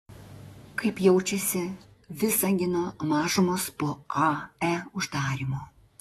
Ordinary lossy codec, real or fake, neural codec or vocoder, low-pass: AAC, 32 kbps; fake; autoencoder, 48 kHz, 128 numbers a frame, DAC-VAE, trained on Japanese speech; 19.8 kHz